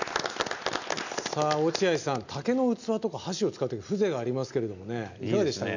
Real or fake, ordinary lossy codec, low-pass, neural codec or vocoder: real; none; 7.2 kHz; none